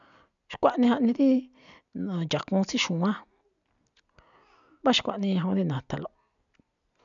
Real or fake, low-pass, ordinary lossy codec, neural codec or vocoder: real; 7.2 kHz; MP3, 96 kbps; none